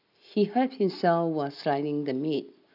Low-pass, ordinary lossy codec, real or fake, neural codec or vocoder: 5.4 kHz; none; real; none